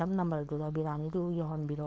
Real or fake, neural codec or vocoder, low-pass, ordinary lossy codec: fake; codec, 16 kHz, 4.8 kbps, FACodec; none; none